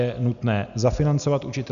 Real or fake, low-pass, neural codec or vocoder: real; 7.2 kHz; none